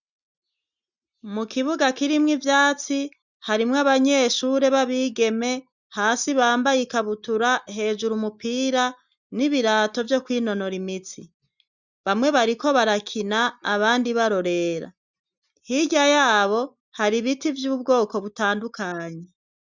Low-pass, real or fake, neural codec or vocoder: 7.2 kHz; real; none